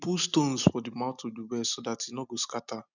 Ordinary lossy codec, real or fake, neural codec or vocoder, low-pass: none; real; none; 7.2 kHz